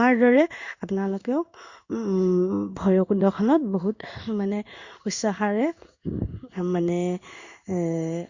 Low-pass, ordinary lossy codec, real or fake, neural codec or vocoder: 7.2 kHz; Opus, 64 kbps; fake; codec, 16 kHz in and 24 kHz out, 1 kbps, XY-Tokenizer